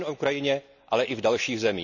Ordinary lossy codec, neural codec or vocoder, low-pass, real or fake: none; none; 7.2 kHz; real